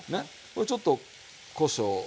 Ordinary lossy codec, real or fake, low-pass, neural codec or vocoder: none; real; none; none